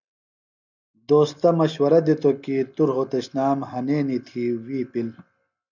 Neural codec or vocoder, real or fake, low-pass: none; real; 7.2 kHz